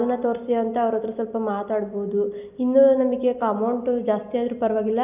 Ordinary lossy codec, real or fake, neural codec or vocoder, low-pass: none; real; none; 3.6 kHz